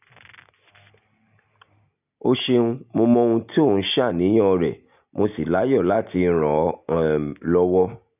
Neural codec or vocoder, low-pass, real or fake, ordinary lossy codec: none; 3.6 kHz; real; none